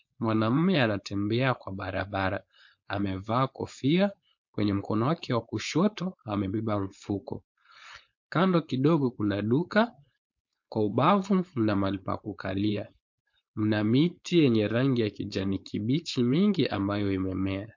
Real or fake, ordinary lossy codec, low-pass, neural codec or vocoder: fake; MP3, 48 kbps; 7.2 kHz; codec, 16 kHz, 4.8 kbps, FACodec